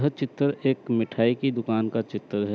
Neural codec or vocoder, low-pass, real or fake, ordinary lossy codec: none; none; real; none